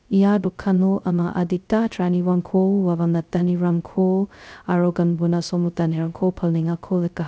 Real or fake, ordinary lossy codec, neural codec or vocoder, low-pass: fake; none; codec, 16 kHz, 0.2 kbps, FocalCodec; none